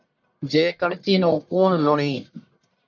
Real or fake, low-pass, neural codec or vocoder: fake; 7.2 kHz; codec, 44.1 kHz, 1.7 kbps, Pupu-Codec